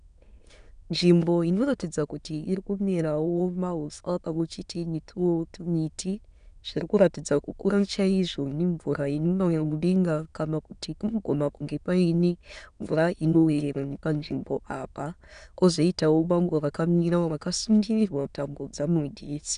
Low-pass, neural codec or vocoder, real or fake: 9.9 kHz; autoencoder, 22.05 kHz, a latent of 192 numbers a frame, VITS, trained on many speakers; fake